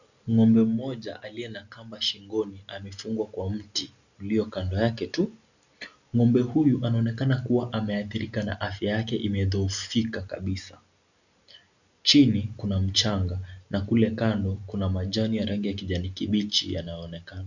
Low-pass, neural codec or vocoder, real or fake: 7.2 kHz; none; real